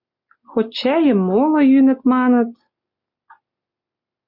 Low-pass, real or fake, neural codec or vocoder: 5.4 kHz; real; none